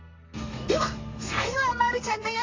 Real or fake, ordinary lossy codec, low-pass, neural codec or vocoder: fake; AAC, 32 kbps; 7.2 kHz; codec, 32 kHz, 1.9 kbps, SNAC